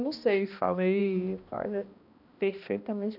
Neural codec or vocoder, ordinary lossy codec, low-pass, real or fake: codec, 16 kHz, 1 kbps, X-Codec, HuBERT features, trained on balanced general audio; none; 5.4 kHz; fake